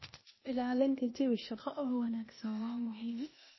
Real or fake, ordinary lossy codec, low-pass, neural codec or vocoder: fake; MP3, 24 kbps; 7.2 kHz; codec, 16 kHz, 0.8 kbps, ZipCodec